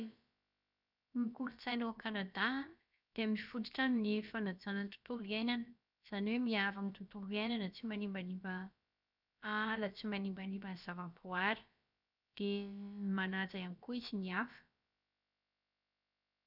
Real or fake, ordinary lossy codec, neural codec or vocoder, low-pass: fake; none; codec, 16 kHz, about 1 kbps, DyCAST, with the encoder's durations; 5.4 kHz